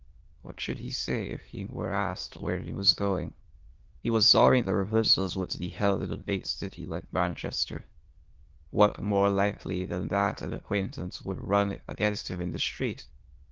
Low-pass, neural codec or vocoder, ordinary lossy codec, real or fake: 7.2 kHz; autoencoder, 22.05 kHz, a latent of 192 numbers a frame, VITS, trained on many speakers; Opus, 16 kbps; fake